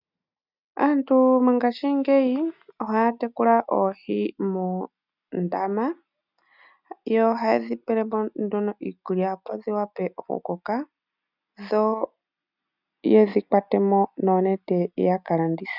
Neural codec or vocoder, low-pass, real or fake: none; 5.4 kHz; real